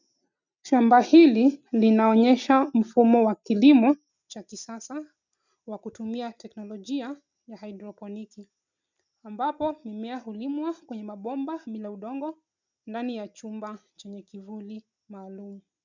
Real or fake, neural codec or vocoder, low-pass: real; none; 7.2 kHz